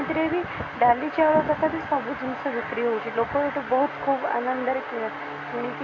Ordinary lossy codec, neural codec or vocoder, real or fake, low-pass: AAC, 48 kbps; none; real; 7.2 kHz